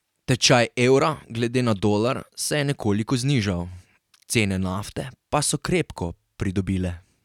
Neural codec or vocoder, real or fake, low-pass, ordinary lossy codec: none; real; 19.8 kHz; none